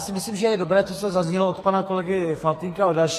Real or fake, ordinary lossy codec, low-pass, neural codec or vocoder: fake; AAC, 48 kbps; 14.4 kHz; codec, 44.1 kHz, 2.6 kbps, SNAC